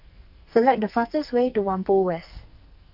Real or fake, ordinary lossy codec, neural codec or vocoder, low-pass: fake; none; codec, 44.1 kHz, 2.6 kbps, SNAC; 5.4 kHz